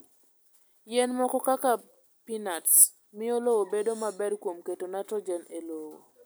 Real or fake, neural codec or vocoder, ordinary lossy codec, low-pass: real; none; none; none